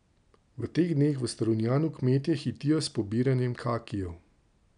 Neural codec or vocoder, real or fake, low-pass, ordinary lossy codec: none; real; 9.9 kHz; none